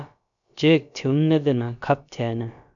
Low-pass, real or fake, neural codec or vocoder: 7.2 kHz; fake; codec, 16 kHz, about 1 kbps, DyCAST, with the encoder's durations